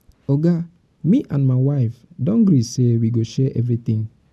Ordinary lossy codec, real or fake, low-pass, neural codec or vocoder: none; real; none; none